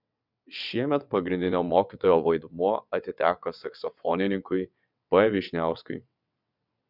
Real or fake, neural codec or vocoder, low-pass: fake; vocoder, 22.05 kHz, 80 mel bands, WaveNeXt; 5.4 kHz